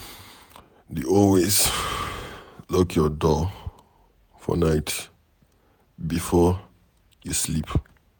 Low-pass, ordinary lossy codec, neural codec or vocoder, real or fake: none; none; vocoder, 48 kHz, 128 mel bands, Vocos; fake